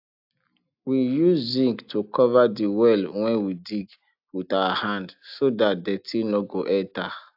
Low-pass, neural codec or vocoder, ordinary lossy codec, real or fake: 5.4 kHz; none; AAC, 48 kbps; real